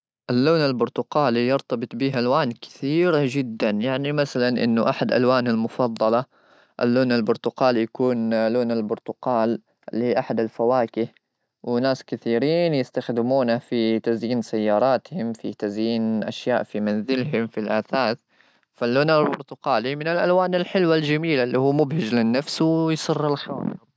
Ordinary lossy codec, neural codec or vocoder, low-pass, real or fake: none; none; none; real